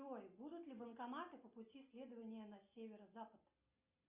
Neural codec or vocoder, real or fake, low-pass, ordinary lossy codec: none; real; 3.6 kHz; Opus, 64 kbps